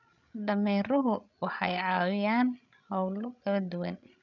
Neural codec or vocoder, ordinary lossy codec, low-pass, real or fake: codec, 16 kHz, 16 kbps, FreqCodec, larger model; none; 7.2 kHz; fake